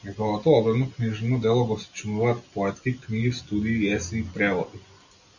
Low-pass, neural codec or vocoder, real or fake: 7.2 kHz; none; real